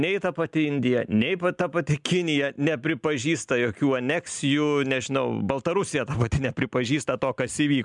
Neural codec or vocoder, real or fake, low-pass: none; real; 10.8 kHz